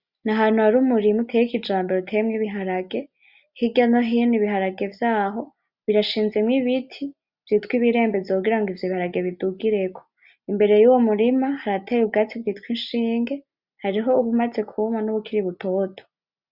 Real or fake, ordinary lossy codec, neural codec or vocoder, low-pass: real; Opus, 64 kbps; none; 5.4 kHz